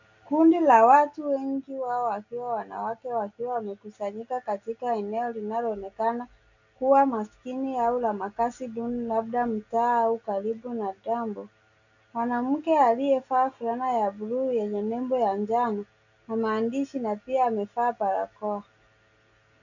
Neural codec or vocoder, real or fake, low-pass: none; real; 7.2 kHz